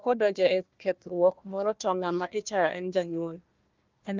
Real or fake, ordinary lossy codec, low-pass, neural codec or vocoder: fake; Opus, 32 kbps; 7.2 kHz; codec, 16 kHz, 1 kbps, FunCodec, trained on Chinese and English, 50 frames a second